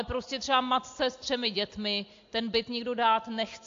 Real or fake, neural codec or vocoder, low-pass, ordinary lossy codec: real; none; 7.2 kHz; AAC, 48 kbps